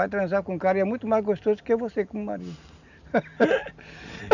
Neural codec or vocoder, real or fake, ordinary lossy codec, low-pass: none; real; none; 7.2 kHz